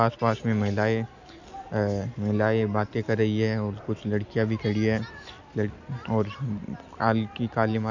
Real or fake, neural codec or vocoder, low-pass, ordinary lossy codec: real; none; 7.2 kHz; none